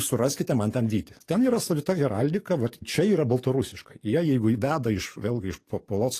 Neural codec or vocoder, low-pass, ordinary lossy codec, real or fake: codec, 44.1 kHz, 7.8 kbps, DAC; 14.4 kHz; AAC, 48 kbps; fake